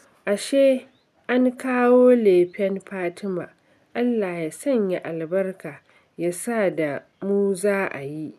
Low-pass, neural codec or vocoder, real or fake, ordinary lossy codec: 14.4 kHz; none; real; none